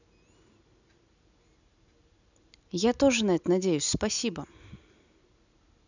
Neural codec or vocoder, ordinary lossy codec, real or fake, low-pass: none; none; real; 7.2 kHz